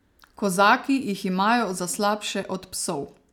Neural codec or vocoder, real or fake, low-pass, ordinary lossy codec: none; real; 19.8 kHz; none